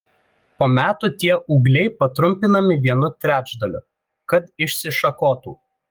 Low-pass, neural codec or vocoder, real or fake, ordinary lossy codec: 19.8 kHz; codec, 44.1 kHz, 7.8 kbps, DAC; fake; Opus, 32 kbps